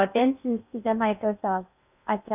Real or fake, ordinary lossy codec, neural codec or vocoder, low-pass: fake; none; codec, 16 kHz in and 24 kHz out, 0.6 kbps, FocalCodec, streaming, 4096 codes; 3.6 kHz